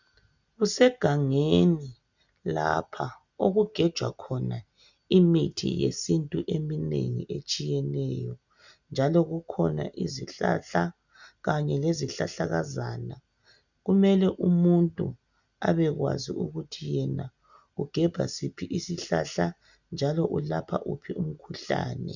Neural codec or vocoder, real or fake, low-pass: none; real; 7.2 kHz